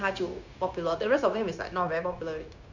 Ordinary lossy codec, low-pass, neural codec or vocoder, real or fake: none; 7.2 kHz; codec, 16 kHz, 0.9 kbps, LongCat-Audio-Codec; fake